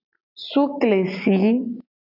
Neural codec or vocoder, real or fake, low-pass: none; real; 5.4 kHz